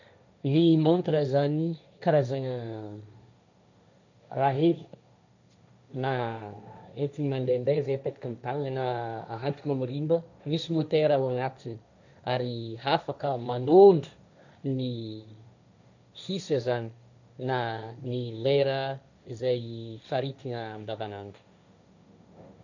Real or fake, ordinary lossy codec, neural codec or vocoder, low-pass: fake; none; codec, 16 kHz, 1.1 kbps, Voila-Tokenizer; 7.2 kHz